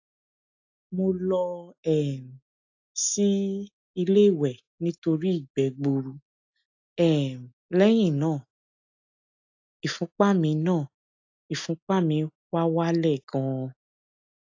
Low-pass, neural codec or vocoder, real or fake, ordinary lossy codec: 7.2 kHz; none; real; AAC, 48 kbps